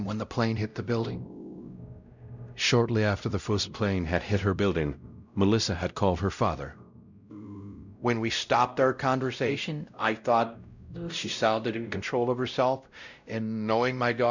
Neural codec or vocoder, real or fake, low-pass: codec, 16 kHz, 0.5 kbps, X-Codec, WavLM features, trained on Multilingual LibriSpeech; fake; 7.2 kHz